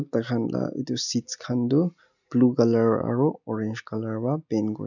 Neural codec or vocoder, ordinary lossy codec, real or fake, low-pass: none; none; real; 7.2 kHz